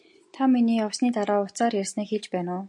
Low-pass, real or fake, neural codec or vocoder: 10.8 kHz; real; none